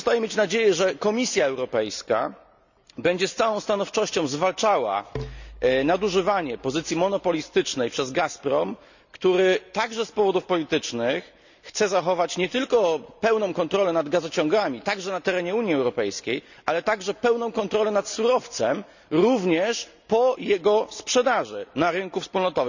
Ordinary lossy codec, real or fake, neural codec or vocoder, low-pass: none; real; none; 7.2 kHz